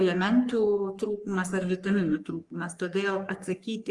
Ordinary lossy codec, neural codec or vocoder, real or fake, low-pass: Opus, 32 kbps; codec, 44.1 kHz, 3.4 kbps, Pupu-Codec; fake; 10.8 kHz